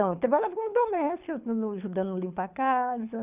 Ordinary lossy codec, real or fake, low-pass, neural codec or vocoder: none; fake; 3.6 kHz; codec, 24 kHz, 6 kbps, HILCodec